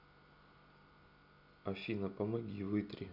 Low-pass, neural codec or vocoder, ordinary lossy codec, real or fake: 5.4 kHz; none; none; real